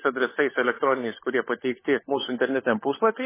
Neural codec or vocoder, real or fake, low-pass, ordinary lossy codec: codec, 16 kHz, 16 kbps, FunCodec, trained on LibriTTS, 50 frames a second; fake; 3.6 kHz; MP3, 16 kbps